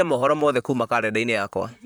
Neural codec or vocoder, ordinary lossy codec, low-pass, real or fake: vocoder, 44.1 kHz, 128 mel bands, Pupu-Vocoder; none; none; fake